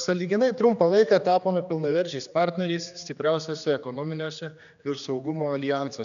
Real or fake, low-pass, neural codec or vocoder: fake; 7.2 kHz; codec, 16 kHz, 2 kbps, X-Codec, HuBERT features, trained on general audio